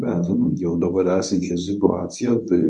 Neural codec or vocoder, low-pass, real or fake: codec, 24 kHz, 0.9 kbps, WavTokenizer, medium speech release version 1; 10.8 kHz; fake